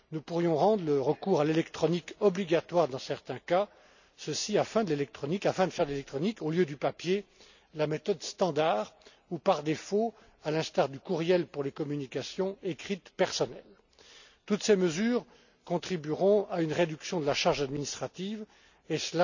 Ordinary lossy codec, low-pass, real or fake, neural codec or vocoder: none; 7.2 kHz; real; none